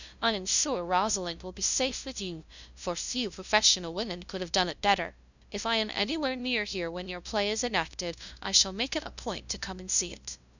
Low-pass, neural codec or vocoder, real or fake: 7.2 kHz; codec, 16 kHz, 0.5 kbps, FunCodec, trained on LibriTTS, 25 frames a second; fake